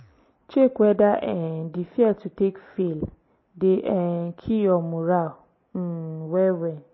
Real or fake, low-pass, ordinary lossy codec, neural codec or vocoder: real; 7.2 kHz; MP3, 24 kbps; none